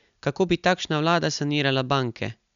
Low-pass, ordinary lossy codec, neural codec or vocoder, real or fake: 7.2 kHz; MP3, 96 kbps; none; real